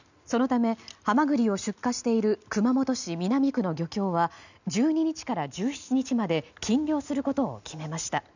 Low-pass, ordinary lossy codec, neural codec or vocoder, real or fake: 7.2 kHz; none; none; real